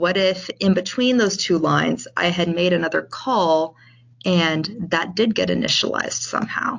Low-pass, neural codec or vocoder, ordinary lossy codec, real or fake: 7.2 kHz; none; AAC, 48 kbps; real